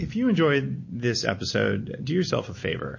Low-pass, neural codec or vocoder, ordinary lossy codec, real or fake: 7.2 kHz; none; MP3, 32 kbps; real